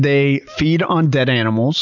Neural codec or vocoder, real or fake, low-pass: none; real; 7.2 kHz